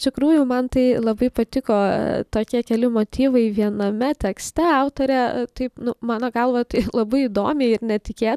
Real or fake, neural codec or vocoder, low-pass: real; none; 14.4 kHz